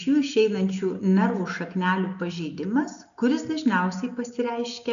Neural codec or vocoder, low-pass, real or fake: none; 7.2 kHz; real